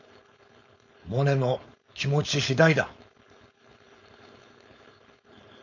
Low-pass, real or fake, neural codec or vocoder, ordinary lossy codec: 7.2 kHz; fake; codec, 16 kHz, 4.8 kbps, FACodec; none